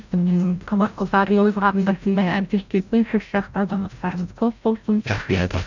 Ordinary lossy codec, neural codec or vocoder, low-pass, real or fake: none; codec, 16 kHz, 0.5 kbps, FreqCodec, larger model; 7.2 kHz; fake